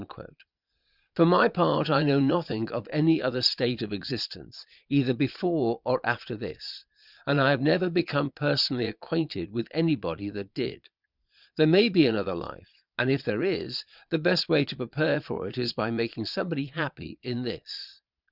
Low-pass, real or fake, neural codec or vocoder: 5.4 kHz; fake; vocoder, 22.05 kHz, 80 mel bands, Vocos